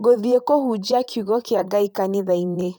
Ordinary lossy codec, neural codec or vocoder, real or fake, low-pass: none; vocoder, 44.1 kHz, 128 mel bands, Pupu-Vocoder; fake; none